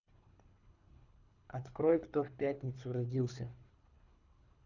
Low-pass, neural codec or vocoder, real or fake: 7.2 kHz; codec, 24 kHz, 3 kbps, HILCodec; fake